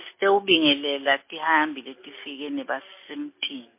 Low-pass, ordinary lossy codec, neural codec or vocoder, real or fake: 3.6 kHz; MP3, 24 kbps; none; real